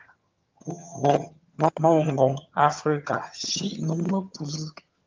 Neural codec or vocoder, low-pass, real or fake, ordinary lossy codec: vocoder, 22.05 kHz, 80 mel bands, HiFi-GAN; 7.2 kHz; fake; Opus, 32 kbps